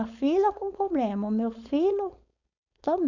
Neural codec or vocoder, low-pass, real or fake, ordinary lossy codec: codec, 16 kHz, 4.8 kbps, FACodec; 7.2 kHz; fake; none